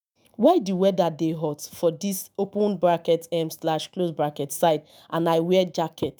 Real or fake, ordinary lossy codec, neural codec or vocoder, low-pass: fake; none; autoencoder, 48 kHz, 128 numbers a frame, DAC-VAE, trained on Japanese speech; none